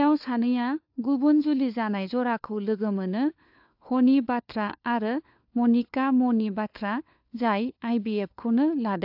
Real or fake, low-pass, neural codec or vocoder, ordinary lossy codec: fake; 5.4 kHz; codec, 16 kHz, 4 kbps, FunCodec, trained on LibriTTS, 50 frames a second; AAC, 48 kbps